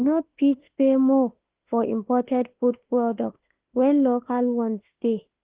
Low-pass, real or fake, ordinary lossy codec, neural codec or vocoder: 3.6 kHz; fake; Opus, 16 kbps; autoencoder, 48 kHz, 32 numbers a frame, DAC-VAE, trained on Japanese speech